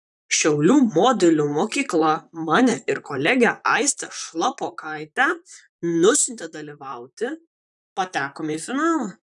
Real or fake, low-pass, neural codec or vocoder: fake; 10.8 kHz; vocoder, 44.1 kHz, 128 mel bands, Pupu-Vocoder